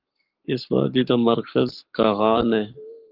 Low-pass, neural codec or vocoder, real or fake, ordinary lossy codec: 5.4 kHz; codec, 44.1 kHz, 7.8 kbps, Pupu-Codec; fake; Opus, 32 kbps